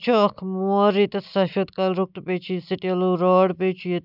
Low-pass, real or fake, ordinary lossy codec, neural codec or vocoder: 5.4 kHz; real; none; none